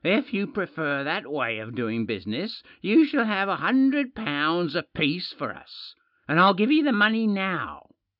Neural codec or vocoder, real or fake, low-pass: none; real; 5.4 kHz